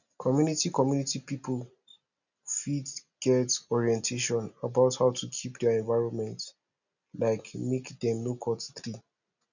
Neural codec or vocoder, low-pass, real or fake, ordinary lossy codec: none; 7.2 kHz; real; none